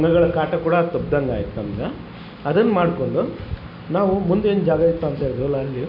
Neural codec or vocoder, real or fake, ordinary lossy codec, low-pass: none; real; AAC, 48 kbps; 5.4 kHz